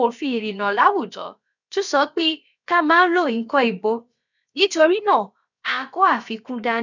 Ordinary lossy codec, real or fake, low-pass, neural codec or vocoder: none; fake; 7.2 kHz; codec, 16 kHz, about 1 kbps, DyCAST, with the encoder's durations